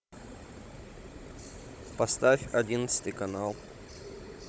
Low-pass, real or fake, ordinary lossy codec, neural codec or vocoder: none; fake; none; codec, 16 kHz, 16 kbps, FunCodec, trained on Chinese and English, 50 frames a second